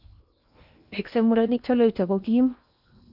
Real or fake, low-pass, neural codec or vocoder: fake; 5.4 kHz; codec, 16 kHz in and 24 kHz out, 0.8 kbps, FocalCodec, streaming, 65536 codes